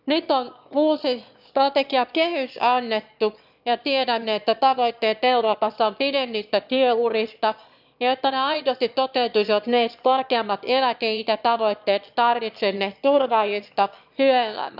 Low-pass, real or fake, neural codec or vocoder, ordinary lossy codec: 5.4 kHz; fake; autoencoder, 22.05 kHz, a latent of 192 numbers a frame, VITS, trained on one speaker; none